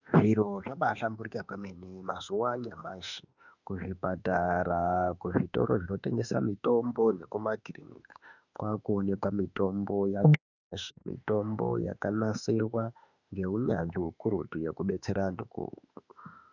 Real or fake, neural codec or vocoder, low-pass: fake; autoencoder, 48 kHz, 32 numbers a frame, DAC-VAE, trained on Japanese speech; 7.2 kHz